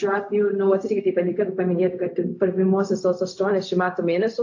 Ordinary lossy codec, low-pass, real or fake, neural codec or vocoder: AAC, 48 kbps; 7.2 kHz; fake; codec, 16 kHz, 0.4 kbps, LongCat-Audio-Codec